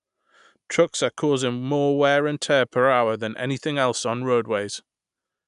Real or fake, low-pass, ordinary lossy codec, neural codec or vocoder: real; 10.8 kHz; none; none